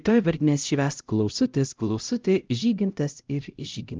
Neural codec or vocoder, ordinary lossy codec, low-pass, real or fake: codec, 16 kHz, 0.5 kbps, X-Codec, HuBERT features, trained on LibriSpeech; Opus, 24 kbps; 7.2 kHz; fake